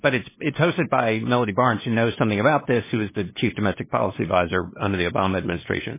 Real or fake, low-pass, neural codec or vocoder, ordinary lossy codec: fake; 3.6 kHz; codec, 16 kHz, 2 kbps, FunCodec, trained on LibriTTS, 25 frames a second; MP3, 16 kbps